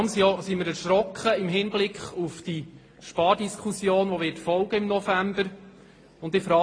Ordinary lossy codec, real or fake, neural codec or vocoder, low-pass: AAC, 32 kbps; real; none; 9.9 kHz